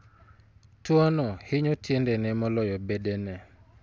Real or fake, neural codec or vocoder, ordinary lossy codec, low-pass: real; none; none; none